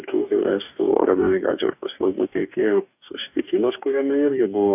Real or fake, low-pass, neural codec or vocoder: fake; 3.6 kHz; codec, 44.1 kHz, 2.6 kbps, DAC